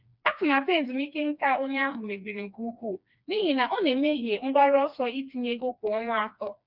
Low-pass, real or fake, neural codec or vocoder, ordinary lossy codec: 5.4 kHz; fake; codec, 16 kHz, 2 kbps, FreqCodec, smaller model; none